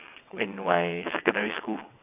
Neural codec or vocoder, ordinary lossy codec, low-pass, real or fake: vocoder, 22.05 kHz, 80 mel bands, WaveNeXt; none; 3.6 kHz; fake